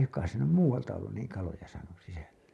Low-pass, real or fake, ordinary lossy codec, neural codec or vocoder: none; real; none; none